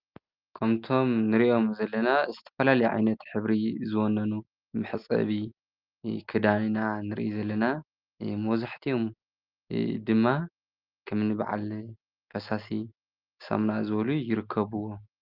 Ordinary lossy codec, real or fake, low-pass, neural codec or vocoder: Opus, 24 kbps; real; 5.4 kHz; none